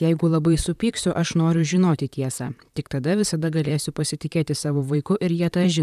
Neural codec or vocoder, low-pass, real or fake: vocoder, 44.1 kHz, 128 mel bands, Pupu-Vocoder; 14.4 kHz; fake